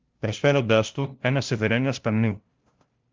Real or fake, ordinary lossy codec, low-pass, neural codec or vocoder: fake; Opus, 32 kbps; 7.2 kHz; codec, 16 kHz, 0.5 kbps, FunCodec, trained on LibriTTS, 25 frames a second